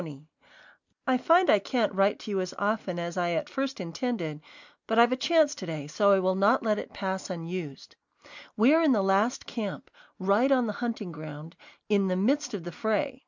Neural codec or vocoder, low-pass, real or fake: none; 7.2 kHz; real